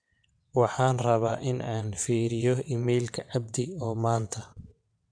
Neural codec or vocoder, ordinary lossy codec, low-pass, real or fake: vocoder, 22.05 kHz, 80 mel bands, WaveNeXt; none; 9.9 kHz; fake